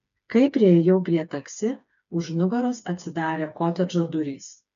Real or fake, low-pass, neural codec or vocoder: fake; 7.2 kHz; codec, 16 kHz, 4 kbps, FreqCodec, smaller model